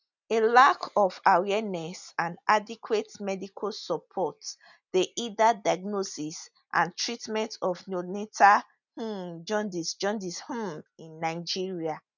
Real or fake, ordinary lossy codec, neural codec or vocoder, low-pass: real; none; none; 7.2 kHz